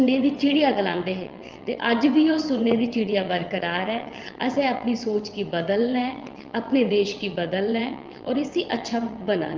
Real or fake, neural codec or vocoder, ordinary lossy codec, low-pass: fake; vocoder, 22.05 kHz, 80 mel bands, WaveNeXt; Opus, 32 kbps; 7.2 kHz